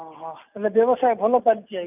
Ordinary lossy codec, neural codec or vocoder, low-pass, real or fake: none; none; 3.6 kHz; real